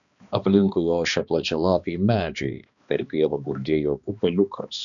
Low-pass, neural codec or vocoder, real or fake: 7.2 kHz; codec, 16 kHz, 2 kbps, X-Codec, HuBERT features, trained on balanced general audio; fake